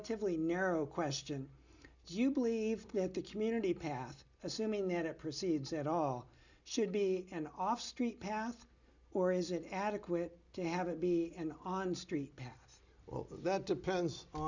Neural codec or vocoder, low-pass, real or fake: none; 7.2 kHz; real